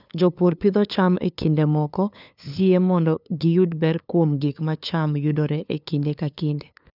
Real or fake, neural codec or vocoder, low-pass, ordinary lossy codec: fake; codec, 16 kHz, 2 kbps, FunCodec, trained on LibriTTS, 25 frames a second; 5.4 kHz; none